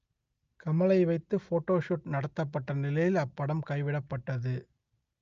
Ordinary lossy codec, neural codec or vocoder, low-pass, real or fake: Opus, 24 kbps; none; 7.2 kHz; real